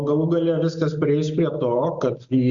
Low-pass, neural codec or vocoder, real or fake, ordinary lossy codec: 7.2 kHz; none; real; Opus, 64 kbps